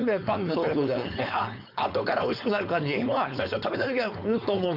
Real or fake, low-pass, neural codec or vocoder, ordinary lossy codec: fake; 5.4 kHz; codec, 16 kHz, 4.8 kbps, FACodec; none